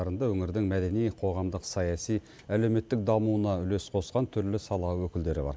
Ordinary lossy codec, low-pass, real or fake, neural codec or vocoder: none; none; real; none